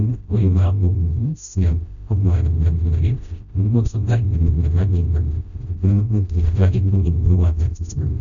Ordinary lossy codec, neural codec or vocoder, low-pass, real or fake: none; codec, 16 kHz, 0.5 kbps, FreqCodec, smaller model; 7.2 kHz; fake